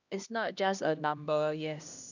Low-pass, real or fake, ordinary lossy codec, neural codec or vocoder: 7.2 kHz; fake; none; codec, 16 kHz, 1 kbps, X-Codec, HuBERT features, trained on LibriSpeech